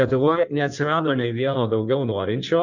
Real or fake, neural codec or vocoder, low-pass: fake; codec, 16 kHz in and 24 kHz out, 1.1 kbps, FireRedTTS-2 codec; 7.2 kHz